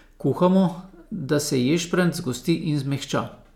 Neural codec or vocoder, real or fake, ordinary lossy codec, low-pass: none; real; none; 19.8 kHz